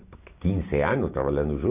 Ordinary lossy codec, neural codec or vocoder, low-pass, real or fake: none; none; 3.6 kHz; real